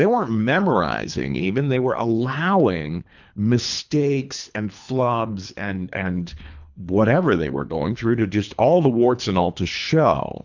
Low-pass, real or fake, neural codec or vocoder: 7.2 kHz; fake; codec, 24 kHz, 3 kbps, HILCodec